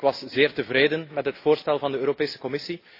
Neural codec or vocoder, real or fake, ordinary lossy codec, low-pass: none; real; AAC, 32 kbps; 5.4 kHz